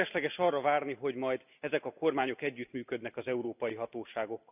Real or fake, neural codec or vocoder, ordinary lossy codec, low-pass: real; none; none; 3.6 kHz